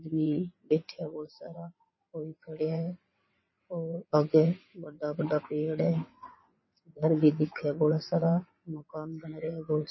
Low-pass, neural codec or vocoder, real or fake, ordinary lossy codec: 7.2 kHz; vocoder, 22.05 kHz, 80 mel bands, WaveNeXt; fake; MP3, 24 kbps